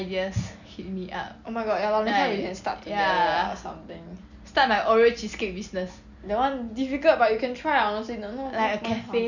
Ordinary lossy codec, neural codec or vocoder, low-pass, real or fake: none; none; 7.2 kHz; real